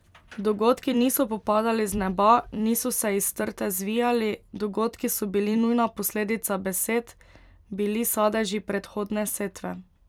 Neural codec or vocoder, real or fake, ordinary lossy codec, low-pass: vocoder, 44.1 kHz, 128 mel bands every 256 samples, BigVGAN v2; fake; none; 19.8 kHz